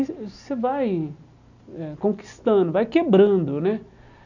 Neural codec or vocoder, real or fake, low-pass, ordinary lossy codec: none; real; 7.2 kHz; none